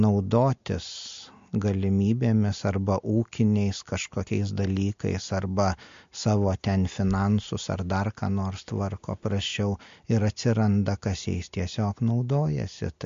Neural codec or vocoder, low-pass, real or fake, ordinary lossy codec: none; 7.2 kHz; real; MP3, 48 kbps